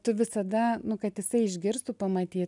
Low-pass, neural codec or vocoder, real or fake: 10.8 kHz; none; real